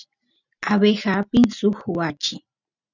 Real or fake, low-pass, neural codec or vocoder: real; 7.2 kHz; none